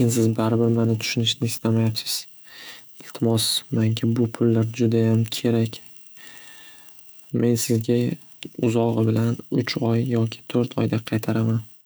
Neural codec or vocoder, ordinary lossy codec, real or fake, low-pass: autoencoder, 48 kHz, 128 numbers a frame, DAC-VAE, trained on Japanese speech; none; fake; none